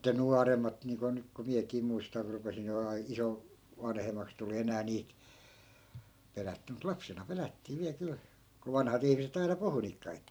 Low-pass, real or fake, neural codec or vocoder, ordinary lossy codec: none; fake; vocoder, 44.1 kHz, 128 mel bands every 256 samples, BigVGAN v2; none